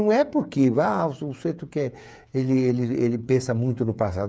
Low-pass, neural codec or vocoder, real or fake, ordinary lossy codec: none; codec, 16 kHz, 8 kbps, FreqCodec, smaller model; fake; none